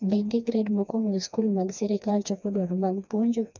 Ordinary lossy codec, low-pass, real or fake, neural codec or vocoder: none; 7.2 kHz; fake; codec, 16 kHz, 2 kbps, FreqCodec, smaller model